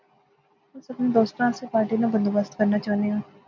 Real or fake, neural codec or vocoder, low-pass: real; none; 7.2 kHz